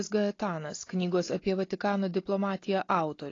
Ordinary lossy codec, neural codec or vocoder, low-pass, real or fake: AAC, 32 kbps; none; 7.2 kHz; real